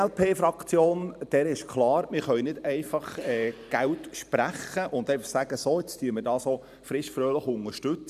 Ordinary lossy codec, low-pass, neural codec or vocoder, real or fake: none; 14.4 kHz; vocoder, 44.1 kHz, 128 mel bands every 512 samples, BigVGAN v2; fake